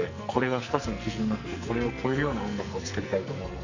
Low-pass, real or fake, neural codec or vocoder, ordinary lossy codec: 7.2 kHz; fake; codec, 44.1 kHz, 2.6 kbps, SNAC; none